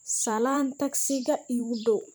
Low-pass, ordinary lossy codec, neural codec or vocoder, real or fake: none; none; vocoder, 44.1 kHz, 128 mel bands every 512 samples, BigVGAN v2; fake